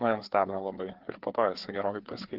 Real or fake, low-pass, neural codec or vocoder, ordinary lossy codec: fake; 5.4 kHz; codec, 16 kHz, 4 kbps, FreqCodec, larger model; Opus, 16 kbps